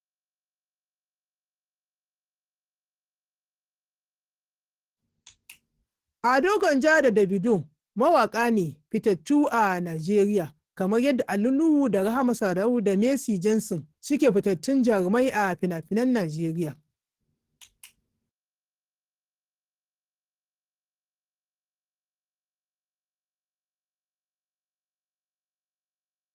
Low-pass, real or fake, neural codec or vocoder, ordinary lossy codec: 14.4 kHz; fake; codec, 44.1 kHz, 7.8 kbps, DAC; Opus, 16 kbps